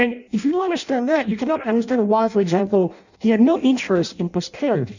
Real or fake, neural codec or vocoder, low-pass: fake; codec, 16 kHz in and 24 kHz out, 0.6 kbps, FireRedTTS-2 codec; 7.2 kHz